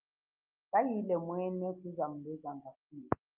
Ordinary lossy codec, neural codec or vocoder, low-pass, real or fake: Opus, 24 kbps; none; 3.6 kHz; real